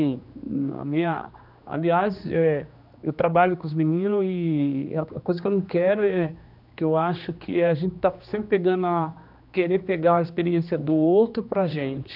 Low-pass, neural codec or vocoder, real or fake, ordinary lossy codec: 5.4 kHz; codec, 16 kHz, 2 kbps, X-Codec, HuBERT features, trained on general audio; fake; none